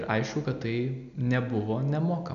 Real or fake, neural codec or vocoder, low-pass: real; none; 7.2 kHz